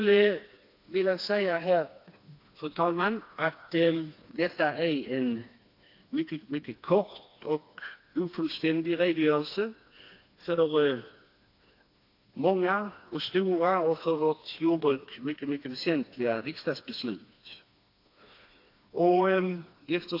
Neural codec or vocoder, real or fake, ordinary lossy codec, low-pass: codec, 16 kHz, 2 kbps, FreqCodec, smaller model; fake; AAC, 32 kbps; 5.4 kHz